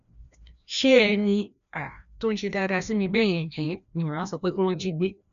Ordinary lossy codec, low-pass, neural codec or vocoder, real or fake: none; 7.2 kHz; codec, 16 kHz, 1 kbps, FreqCodec, larger model; fake